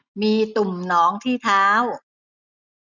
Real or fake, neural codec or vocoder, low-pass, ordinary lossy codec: real; none; 7.2 kHz; none